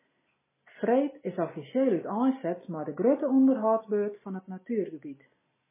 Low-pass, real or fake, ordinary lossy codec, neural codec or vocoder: 3.6 kHz; real; MP3, 16 kbps; none